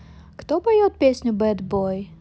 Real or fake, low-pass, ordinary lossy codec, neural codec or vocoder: real; none; none; none